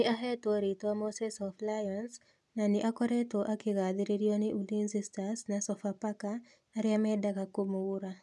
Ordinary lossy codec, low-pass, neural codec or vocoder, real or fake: none; none; none; real